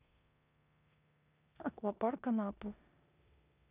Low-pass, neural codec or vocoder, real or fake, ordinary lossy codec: 3.6 kHz; codec, 16 kHz in and 24 kHz out, 0.9 kbps, LongCat-Audio-Codec, four codebook decoder; fake; none